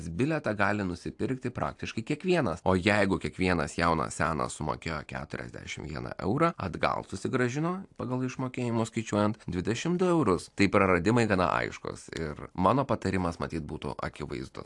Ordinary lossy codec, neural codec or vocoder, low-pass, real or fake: AAC, 64 kbps; none; 10.8 kHz; real